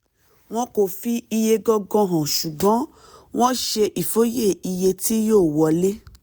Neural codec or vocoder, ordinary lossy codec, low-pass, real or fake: none; none; none; real